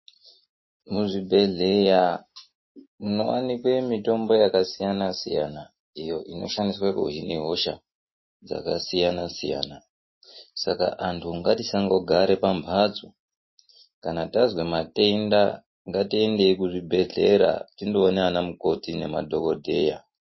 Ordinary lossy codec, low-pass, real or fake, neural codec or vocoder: MP3, 24 kbps; 7.2 kHz; real; none